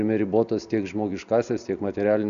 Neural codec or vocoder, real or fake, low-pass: none; real; 7.2 kHz